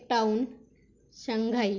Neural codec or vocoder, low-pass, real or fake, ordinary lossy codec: none; 7.2 kHz; real; Opus, 64 kbps